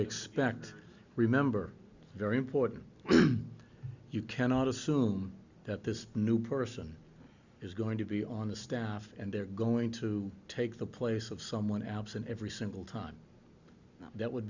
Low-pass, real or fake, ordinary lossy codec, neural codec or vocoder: 7.2 kHz; real; Opus, 64 kbps; none